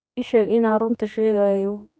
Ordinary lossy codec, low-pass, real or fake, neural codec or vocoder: none; none; fake; codec, 16 kHz, 2 kbps, X-Codec, HuBERT features, trained on general audio